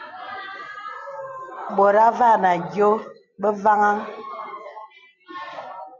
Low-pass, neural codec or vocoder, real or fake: 7.2 kHz; none; real